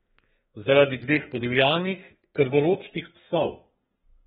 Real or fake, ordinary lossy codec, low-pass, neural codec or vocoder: fake; AAC, 16 kbps; 14.4 kHz; codec, 32 kHz, 1.9 kbps, SNAC